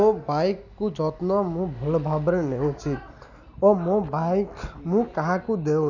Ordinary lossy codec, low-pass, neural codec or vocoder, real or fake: none; 7.2 kHz; none; real